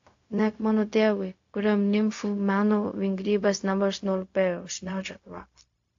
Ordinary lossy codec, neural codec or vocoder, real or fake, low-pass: AAC, 48 kbps; codec, 16 kHz, 0.4 kbps, LongCat-Audio-Codec; fake; 7.2 kHz